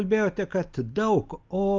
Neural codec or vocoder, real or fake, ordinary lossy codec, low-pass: none; real; Opus, 24 kbps; 7.2 kHz